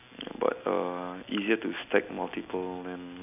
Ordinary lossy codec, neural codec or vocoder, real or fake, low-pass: none; none; real; 3.6 kHz